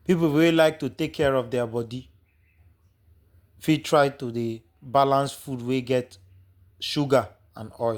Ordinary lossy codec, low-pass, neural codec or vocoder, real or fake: Opus, 64 kbps; 19.8 kHz; none; real